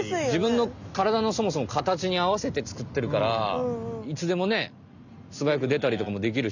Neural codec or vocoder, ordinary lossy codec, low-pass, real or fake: none; none; 7.2 kHz; real